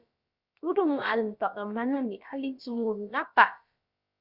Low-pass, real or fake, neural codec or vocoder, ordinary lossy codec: 5.4 kHz; fake; codec, 16 kHz, about 1 kbps, DyCAST, with the encoder's durations; Opus, 64 kbps